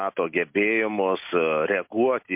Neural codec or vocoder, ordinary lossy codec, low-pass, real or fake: none; MP3, 32 kbps; 3.6 kHz; real